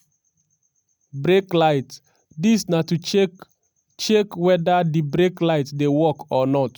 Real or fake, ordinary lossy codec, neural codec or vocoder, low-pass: real; none; none; none